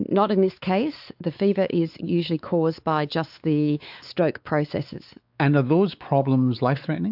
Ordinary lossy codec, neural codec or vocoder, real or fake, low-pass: AAC, 48 kbps; codec, 16 kHz, 4 kbps, X-Codec, WavLM features, trained on Multilingual LibriSpeech; fake; 5.4 kHz